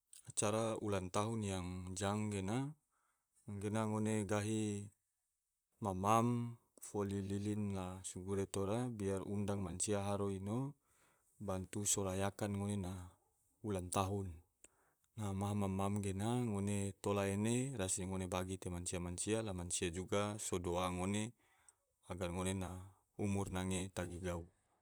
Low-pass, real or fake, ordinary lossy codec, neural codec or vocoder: none; fake; none; vocoder, 44.1 kHz, 128 mel bands, Pupu-Vocoder